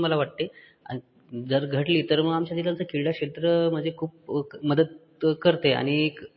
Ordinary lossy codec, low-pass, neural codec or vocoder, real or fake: MP3, 24 kbps; 7.2 kHz; none; real